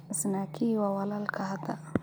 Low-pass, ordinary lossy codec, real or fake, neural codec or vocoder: none; none; real; none